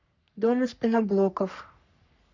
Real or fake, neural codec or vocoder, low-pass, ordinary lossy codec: fake; codec, 44.1 kHz, 3.4 kbps, Pupu-Codec; 7.2 kHz; none